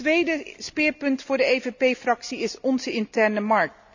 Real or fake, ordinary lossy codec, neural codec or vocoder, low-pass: real; none; none; 7.2 kHz